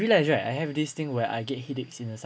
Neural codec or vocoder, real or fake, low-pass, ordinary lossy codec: none; real; none; none